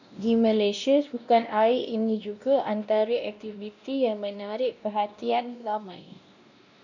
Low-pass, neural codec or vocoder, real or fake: 7.2 kHz; codec, 16 kHz, 1 kbps, X-Codec, WavLM features, trained on Multilingual LibriSpeech; fake